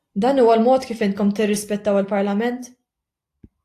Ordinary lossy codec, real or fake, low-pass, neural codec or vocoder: AAC, 64 kbps; real; 14.4 kHz; none